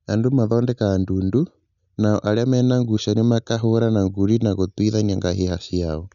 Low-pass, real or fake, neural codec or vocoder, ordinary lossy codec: 7.2 kHz; real; none; none